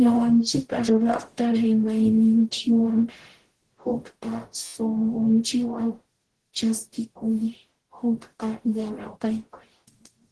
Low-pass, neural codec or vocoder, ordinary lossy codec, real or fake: 10.8 kHz; codec, 44.1 kHz, 0.9 kbps, DAC; Opus, 16 kbps; fake